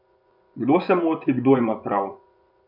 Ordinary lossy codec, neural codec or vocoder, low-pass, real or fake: none; vocoder, 24 kHz, 100 mel bands, Vocos; 5.4 kHz; fake